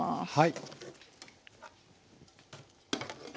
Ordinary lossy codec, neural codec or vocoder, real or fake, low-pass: none; none; real; none